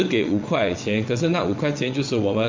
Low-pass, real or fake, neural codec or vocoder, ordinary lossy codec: 7.2 kHz; fake; vocoder, 22.05 kHz, 80 mel bands, WaveNeXt; MP3, 48 kbps